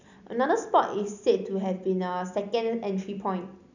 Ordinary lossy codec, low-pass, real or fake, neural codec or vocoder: none; 7.2 kHz; real; none